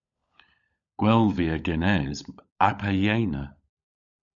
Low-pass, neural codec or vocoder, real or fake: 7.2 kHz; codec, 16 kHz, 16 kbps, FunCodec, trained on LibriTTS, 50 frames a second; fake